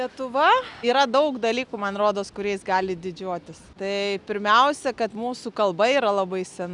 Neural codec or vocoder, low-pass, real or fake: none; 10.8 kHz; real